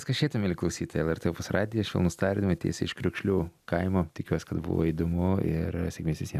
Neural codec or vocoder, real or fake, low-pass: none; real; 14.4 kHz